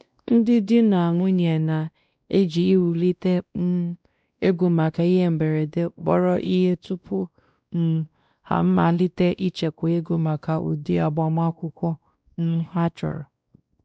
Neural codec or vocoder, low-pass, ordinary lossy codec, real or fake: codec, 16 kHz, 1 kbps, X-Codec, WavLM features, trained on Multilingual LibriSpeech; none; none; fake